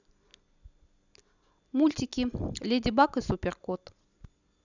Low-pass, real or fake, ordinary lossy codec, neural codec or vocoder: 7.2 kHz; real; none; none